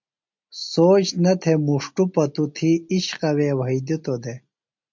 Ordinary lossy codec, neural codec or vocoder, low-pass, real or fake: MP3, 64 kbps; none; 7.2 kHz; real